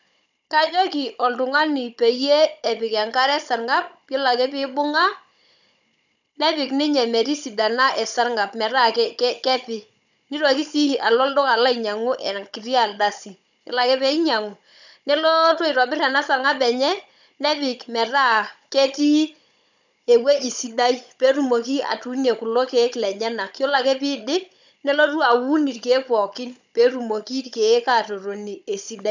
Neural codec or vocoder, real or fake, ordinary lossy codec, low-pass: codec, 16 kHz, 16 kbps, FunCodec, trained on Chinese and English, 50 frames a second; fake; none; 7.2 kHz